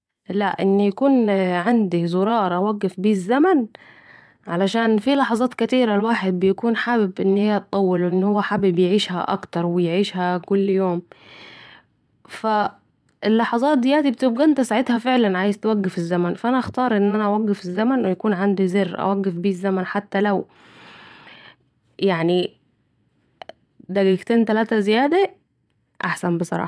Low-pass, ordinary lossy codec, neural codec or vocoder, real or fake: none; none; vocoder, 22.05 kHz, 80 mel bands, Vocos; fake